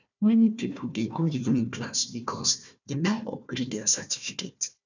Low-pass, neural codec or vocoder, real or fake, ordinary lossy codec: 7.2 kHz; codec, 16 kHz, 1 kbps, FunCodec, trained on Chinese and English, 50 frames a second; fake; none